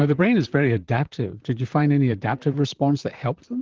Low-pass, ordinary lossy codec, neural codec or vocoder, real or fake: 7.2 kHz; Opus, 16 kbps; vocoder, 44.1 kHz, 128 mel bands, Pupu-Vocoder; fake